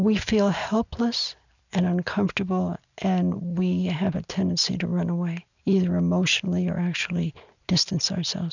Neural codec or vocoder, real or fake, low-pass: none; real; 7.2 kHz